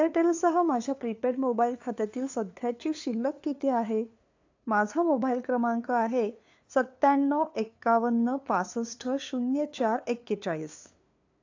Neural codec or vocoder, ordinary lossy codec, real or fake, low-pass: codec, 16 kHz, 2 kbps, FunCodec, trained on LibriTTS, 25 frames a second; AAC, 48 kbps; fake; 7.2 kHz